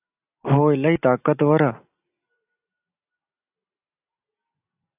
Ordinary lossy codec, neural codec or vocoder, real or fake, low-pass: AAC, 32 kbps; none; real; 3.6 kHz